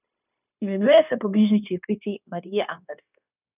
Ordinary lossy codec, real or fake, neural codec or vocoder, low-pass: none; fake; codec, 16 kHz, 0.9 kbps, LongCat-Audio-Codec; 3.6 kHz